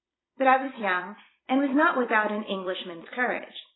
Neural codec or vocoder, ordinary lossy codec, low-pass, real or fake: vocoder, 44.1 kHz, 128 mel bands every 256 samples, BigVGAN v2; AAC, 16 kbps; 7.2 kHz; fake